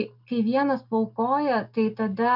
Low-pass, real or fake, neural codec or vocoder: 5.4 kHz; real; none